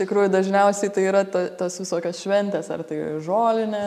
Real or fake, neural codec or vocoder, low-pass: real; none; 14.4 kHz